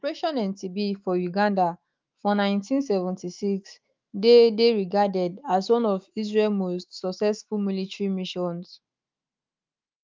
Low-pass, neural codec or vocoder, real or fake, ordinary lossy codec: 7.2 kHz; autoencoder, 48 kHz, 128 numbers a frame, DAC-VAE, trained on Japanese speech; fake; Opus, 24 kbps